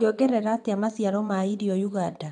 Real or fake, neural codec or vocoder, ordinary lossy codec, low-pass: fake; vocoder, 22.05 kHz, 80 mel bands, WaveNeXt; none; 9.9 kHz